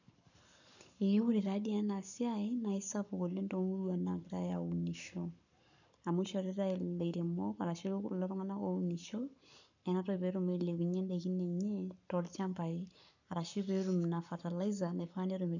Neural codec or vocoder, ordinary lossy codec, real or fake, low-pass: codec, 44.1 kHz, 7.8 kbps, Pupu-Codec; none; fake; 7.2 kHz